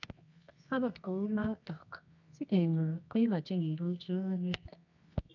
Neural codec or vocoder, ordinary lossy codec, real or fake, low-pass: codec, 24 kHz, 0.9 kbps, WavTokenizer, medium music audio release; none; fake; 7.2 kHz